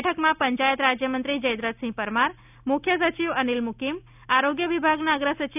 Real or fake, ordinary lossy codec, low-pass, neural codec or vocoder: real; none; 3.6 kHz; none